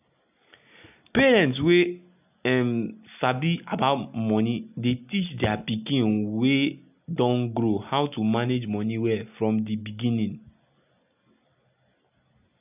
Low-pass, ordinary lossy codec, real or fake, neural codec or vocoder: 3.6 kHz; none; real; none